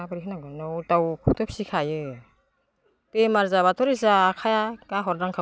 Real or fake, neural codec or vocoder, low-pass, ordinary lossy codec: real; none; none; none